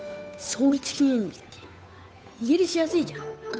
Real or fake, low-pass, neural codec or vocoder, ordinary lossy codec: fake; none; codec, 16 kHz, 8 kbps, FunCodec, trained on Chinese and English, 25 frames a second; none